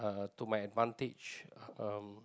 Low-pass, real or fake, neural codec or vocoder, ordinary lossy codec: none; real; none; none